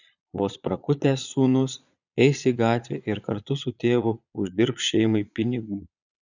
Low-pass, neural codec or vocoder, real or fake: 7.2 kHz; vocoder, 22.05 kHz, 80 mel bands, Vocos; fake